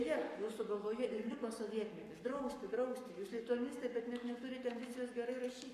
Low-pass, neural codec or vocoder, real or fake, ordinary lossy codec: 14.4 kHz; codec, 44.1 kHz, 7.8 kbps, Pupu-Codec; fake; MP3, 64 kbps